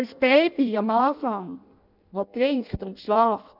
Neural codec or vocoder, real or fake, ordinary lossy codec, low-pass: codec, 16 kHz in and 24 kHz out, 0.6 kbps, FireRedTTS-2 codec; fake; none; 5.4 kHz